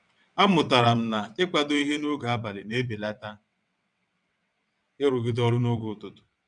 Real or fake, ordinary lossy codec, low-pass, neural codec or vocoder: fake; none; 9.9 kHz; vocoder, 22.05 kHz, 80 mel bands, WaveNeXt